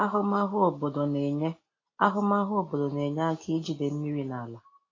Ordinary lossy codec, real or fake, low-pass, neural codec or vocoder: AAC, 32 kbps; real; 7.2 kHz; none